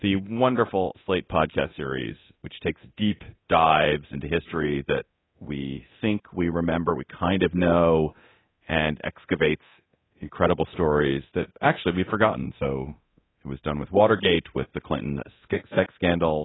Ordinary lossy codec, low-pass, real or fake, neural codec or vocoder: AAC, 16 kbps; 7.2 kHz; fake; codec, 24 kHz, 0.9 kbps, DualCodec